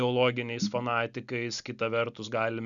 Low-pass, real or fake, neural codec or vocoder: 7.2 kHz; real; none